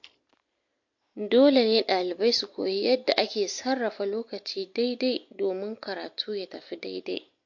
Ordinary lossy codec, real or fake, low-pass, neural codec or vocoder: MP3, 48 kbps; real; 7.2 kHz; none